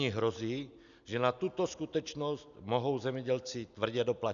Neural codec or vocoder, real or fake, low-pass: none; real; 7.2 kHz